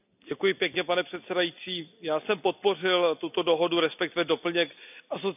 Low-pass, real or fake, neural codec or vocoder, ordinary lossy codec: 3.6 kHz; real; none; none